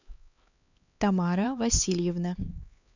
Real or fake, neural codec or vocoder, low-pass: fake; codec, 16 kHz, 4 kbps, X-Codec, HuBERT features, trained on LibriSpeech; 7.2 kHz